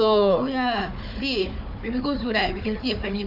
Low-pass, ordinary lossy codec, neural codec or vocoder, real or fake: 5.4 kHz; none; codec, 16 kHz, 4 kbps, FunCodec, trained on Chinese and English, 50 frames a second; fake